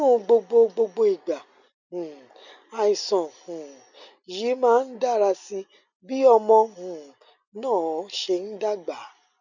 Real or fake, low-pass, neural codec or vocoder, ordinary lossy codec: real; 7.2 kHz; none; none